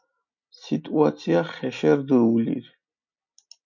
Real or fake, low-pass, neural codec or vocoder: real; 7.2 kHz; none